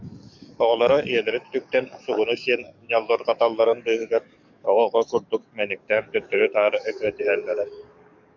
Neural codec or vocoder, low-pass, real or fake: codec, 44.1 kHz, 7.8 kbps, DAC; 7.2 kHz; fake